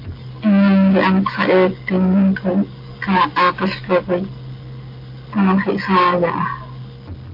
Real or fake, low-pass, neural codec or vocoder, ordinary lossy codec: real; 5.4 kHz; none; AAC, 32 kbps